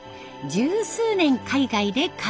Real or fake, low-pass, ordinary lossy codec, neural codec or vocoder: real; none; none; none